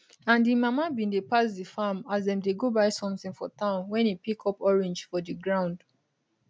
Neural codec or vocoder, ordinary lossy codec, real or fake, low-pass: none; none; real; none